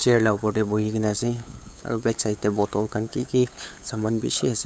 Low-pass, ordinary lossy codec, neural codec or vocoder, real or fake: none; none; codec, 16 kHz, 8 kbps, FunCodec, trained on LibriTTS, 25 frames a second; fake